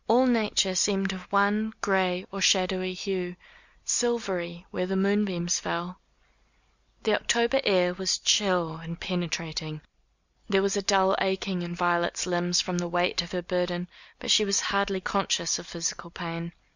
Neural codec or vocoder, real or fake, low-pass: none; real; 7.2 kHz